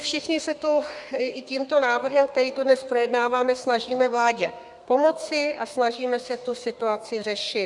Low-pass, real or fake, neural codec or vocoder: 10.8 kHz; fake; codec, 32 kHz, 1.9 kbps, SNAC